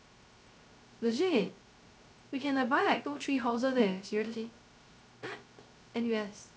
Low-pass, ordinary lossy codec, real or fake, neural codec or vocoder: none; none; fake; codec, 16 kHz, 0.3 kbps, FocalCodec